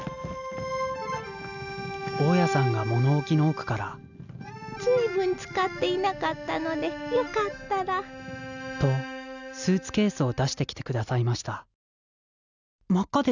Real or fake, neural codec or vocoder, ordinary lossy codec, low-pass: real; none; none; 7.2 kHz